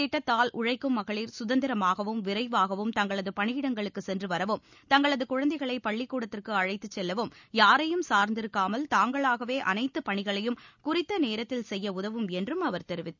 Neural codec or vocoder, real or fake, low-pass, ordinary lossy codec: none; real; 7.2 kHz; none